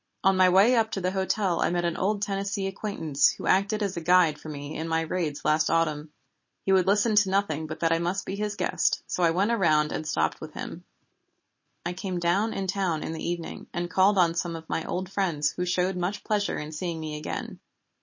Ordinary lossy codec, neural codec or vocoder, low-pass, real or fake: MP3, 32 kbps; none; 7.2 kHz; real